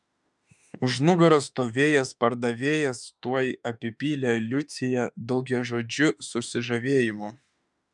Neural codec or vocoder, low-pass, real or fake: autoencoder, 48 kHz, 32 numbers a frame, DAC-VAE, trained on Japanese speech; 10.8 kHz; fake